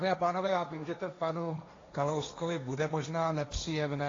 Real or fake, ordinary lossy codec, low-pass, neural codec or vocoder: fake; AAC, 32 kbps; 7.2 kHz; codec, 16 kHz, 1.1 kbps, Voila-Tokenizer